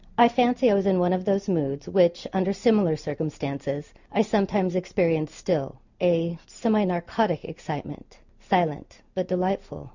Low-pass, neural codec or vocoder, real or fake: 7.2 kHz; none; real